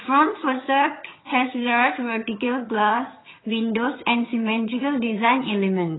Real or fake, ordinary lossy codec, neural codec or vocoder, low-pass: fake; AAC, 16 kbps; vocoder, 22.05 kHz, 80 mel bands, HiFi-GAN; 7.2 kHz